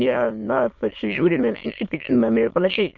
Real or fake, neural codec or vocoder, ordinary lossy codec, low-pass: fake; autoencoder, 22.05 kHz, a latent of 192 numbers a frame, VITS, trained on many speakers; MP3, 48 kbps; 7.2 kHz